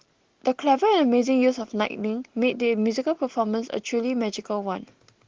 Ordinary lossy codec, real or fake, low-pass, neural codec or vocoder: Opus, 16 kbps; real; 7.2 kHz; none